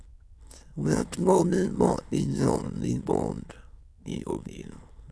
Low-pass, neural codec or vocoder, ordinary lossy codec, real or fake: none; autoencoder, 22.05 kHz, a latent of 192 numbers a frame, VITS, trained on many speakers; none; fake